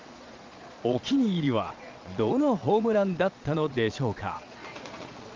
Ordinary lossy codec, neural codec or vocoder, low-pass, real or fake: Opus, 24 kbps; vocoder, 22.05 kHz, 80 mel bands, Vocos; 7.2 kHz; fake